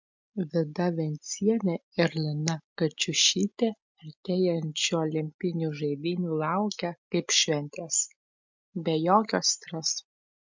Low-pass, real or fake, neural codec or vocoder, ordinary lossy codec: 7.2 kHz; real; none; MP3, 64 kbps